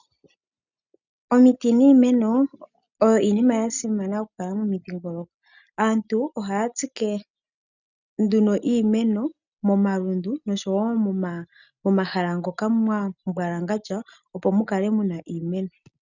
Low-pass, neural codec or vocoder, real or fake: 7.2 kHz; none; real